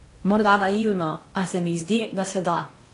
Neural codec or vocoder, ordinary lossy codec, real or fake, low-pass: codec, 16 kHz in and 24 kHz out, 0.8 kbps, FocalCodec, streaming, 65536 codes; AAC, 48 kbps; fake; 10.8 kHz